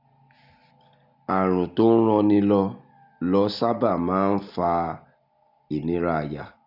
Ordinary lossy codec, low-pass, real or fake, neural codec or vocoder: none; 5.4 kHz; real; none